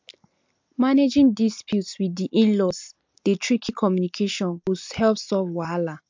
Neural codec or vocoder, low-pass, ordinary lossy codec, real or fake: none; 7.2 kHz; MP3, 64 kbps; real